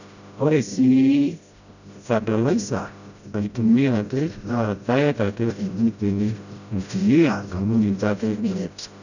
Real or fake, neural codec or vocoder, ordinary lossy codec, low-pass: fake; codec, 16 kHz, 0.5 kbps, FreqCodec, smaller model; none; 7.2 kHz